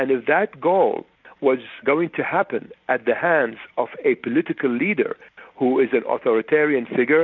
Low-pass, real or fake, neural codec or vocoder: 7.2 kHz; real; none